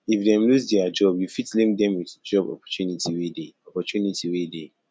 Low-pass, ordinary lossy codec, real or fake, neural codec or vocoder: none; none; real; none